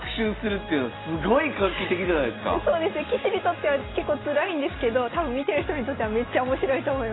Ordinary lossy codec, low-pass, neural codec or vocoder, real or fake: AAC, 16 kbps; 7.2 kHz; none; real